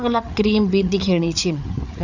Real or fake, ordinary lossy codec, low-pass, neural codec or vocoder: fake; none; 7.2 kHz; codec, 16 kHz, 8 kbps, FreqCodec, larger model